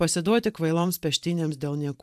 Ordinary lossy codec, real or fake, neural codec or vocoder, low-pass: AAC, 96 kbps; fake; vocoder, 44.1 kHz, 128 mel bands every 512 samples, BigVGAN v2; 14.4 kHz